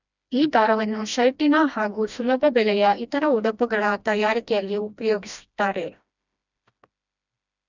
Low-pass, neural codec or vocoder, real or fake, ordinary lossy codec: 7.2 kHz; codec, 16 kHz, 1 kbps, FreqCodec, smaller model; fake; none